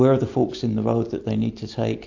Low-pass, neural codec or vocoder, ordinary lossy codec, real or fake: 7.2 kHz; none; AAC, 48 kbps; real